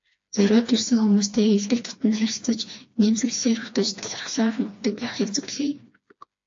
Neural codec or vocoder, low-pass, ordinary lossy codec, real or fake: codec, 16 kHz, 2 kbps, FreqCodec, smaller model; 7.2 kHz; AAC, 48 kbps; fake